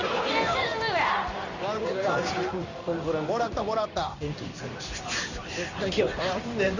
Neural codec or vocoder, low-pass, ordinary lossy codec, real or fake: codec, 16 kHz in and 24 kHz out, 1 kbps, XY-Tokenizer; 7.2 kHz; none; fake